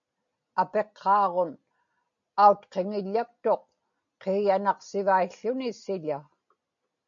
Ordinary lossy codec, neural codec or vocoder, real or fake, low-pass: MP3, 64 kbps; none; real; 7.2 kHz